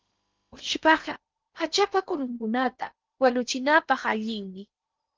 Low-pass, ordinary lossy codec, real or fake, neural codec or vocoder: 7.2 kHz; Opus, 24 kbps; fake; codec, 16 kHz in and 24 kHz out, 0.8 kbps, FocalCodec, streaming, 65536 codes